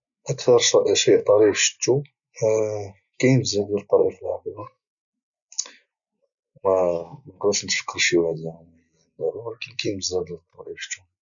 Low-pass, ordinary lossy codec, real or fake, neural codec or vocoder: 7.2 kHz; none; real; none